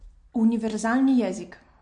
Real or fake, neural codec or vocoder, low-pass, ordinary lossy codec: real; none; 9.9 kHz; MP3, 48 kbps